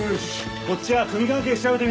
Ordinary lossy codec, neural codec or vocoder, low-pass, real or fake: none; none; none; real